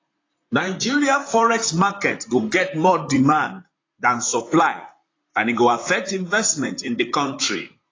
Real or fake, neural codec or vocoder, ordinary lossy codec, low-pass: fake; vocoder, 44.1 kHz, 128 mel bands, Pupu-Vocoder; AAC, 32 kbps; 7.2 kHz